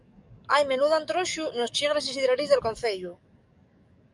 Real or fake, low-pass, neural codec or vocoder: fake; 10.8 kHz; codec, 44.1 kHz, 7.8 kbps, DAC